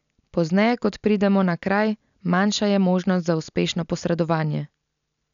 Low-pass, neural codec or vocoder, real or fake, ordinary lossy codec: 7.2 kHz; none; real; none